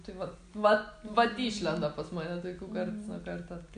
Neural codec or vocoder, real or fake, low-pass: none; real; 9.9 kHz